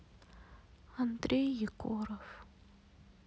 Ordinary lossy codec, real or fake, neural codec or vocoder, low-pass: none; real; none; none